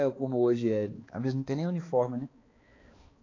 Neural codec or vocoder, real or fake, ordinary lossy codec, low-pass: codec, 16 kHz, 2 kbps, X-Codec, HuBERT features, trained on balanced general audio; fake; AAC, 32 kbps; 7.2 kHz